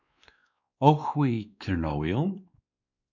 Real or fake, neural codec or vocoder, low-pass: fake; codec, 16 kHz, 2 kbps, X-Codec, WavLM features, trained on Multilingual LibriSpeech; 7.2 kHz